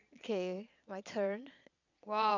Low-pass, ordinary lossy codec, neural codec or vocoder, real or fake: 7.2 kHz; none; vocoder, 22.05 kHz, 80 mel bands, Vocos; fake